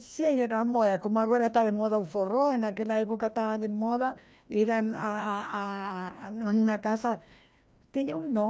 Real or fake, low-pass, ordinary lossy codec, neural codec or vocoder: fake; none; none; codec, 16 kHz, 1 kbps, FreqCodec, larger model